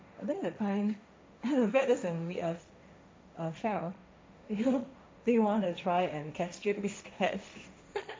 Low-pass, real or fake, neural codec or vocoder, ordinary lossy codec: none; fake; codec, 16 kHz, 1.1 kbps, Voila-Tokenizer; none